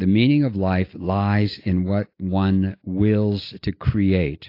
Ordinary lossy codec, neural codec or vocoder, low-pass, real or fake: AAC, 32 kbps; none; 5.4 kHz; real